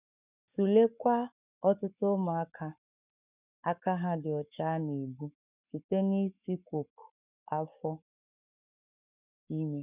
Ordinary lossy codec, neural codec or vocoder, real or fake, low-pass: none; codec, 44.1 kHz, 7.8 kbps, Pupu-Codec; fake; 3.6 kHz